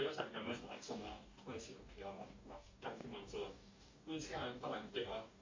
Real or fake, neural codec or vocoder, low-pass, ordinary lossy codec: fake; codec, 44.1 kHz, 2.6 kbps, DAC; 7.2 kHz; MP3, 48 kbps